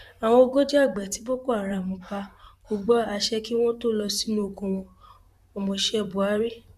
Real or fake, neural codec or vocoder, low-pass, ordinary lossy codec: fake; vocoder, 44.1 kHz, 128 mel bands, Pupu-Vocoder; 14.4 kHz; none